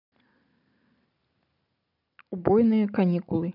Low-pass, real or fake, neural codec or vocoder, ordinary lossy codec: 5.4 kHz; real; none; none